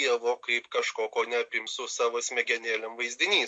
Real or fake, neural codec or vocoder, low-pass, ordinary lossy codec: real; none; 10.8 kHz; MP3, 48 kbps